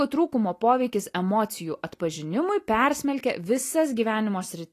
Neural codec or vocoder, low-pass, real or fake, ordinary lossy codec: none; 14.4 kHz; real; AAC, 48 kbps